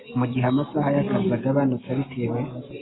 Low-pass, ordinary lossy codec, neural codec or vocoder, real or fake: 7.2 kHz; AAC, 16 kbps; none; real